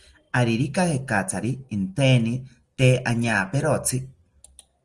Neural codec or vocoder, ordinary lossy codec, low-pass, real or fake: none; Opus, 24 kbps; 10.8 kHz; real